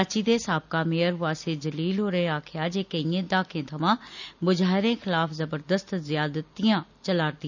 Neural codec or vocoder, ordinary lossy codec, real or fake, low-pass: none; none; real; 7.2 kHz